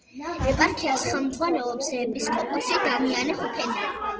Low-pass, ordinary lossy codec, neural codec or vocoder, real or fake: 7.2 kHz; Opus, 16 kbps; none; real